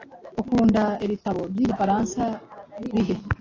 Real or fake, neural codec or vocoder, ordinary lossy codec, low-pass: real; none; AAC, 32 kbps; 7.2 kHz